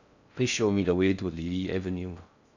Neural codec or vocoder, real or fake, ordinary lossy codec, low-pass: codec, 16 kHz in and 24 kHz out, 0.6 kbps, FocalCodec, streaming, 4096 codes; fake; none; 7.2 kHz